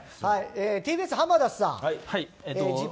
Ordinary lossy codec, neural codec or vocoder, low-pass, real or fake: none; none; none; real